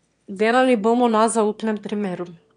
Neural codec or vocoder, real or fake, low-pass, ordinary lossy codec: autoencoder, 22.05 kHz, a latent of 192 numbers a frame, VITS, trained on one speaker; fake; 9.9 kHz; none